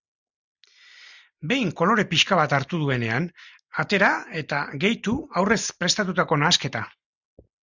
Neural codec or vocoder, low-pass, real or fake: none; 7.2 kHz; real